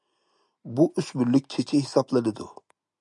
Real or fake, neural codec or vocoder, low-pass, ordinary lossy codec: real; none; 10.8 kHz; MP3, 96 kbps